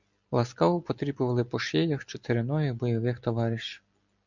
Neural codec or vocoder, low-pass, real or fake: none; 7.2 kHz; real